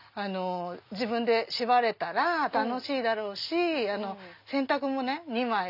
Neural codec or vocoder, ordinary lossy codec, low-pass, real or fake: none; none; 5.4 kHz; real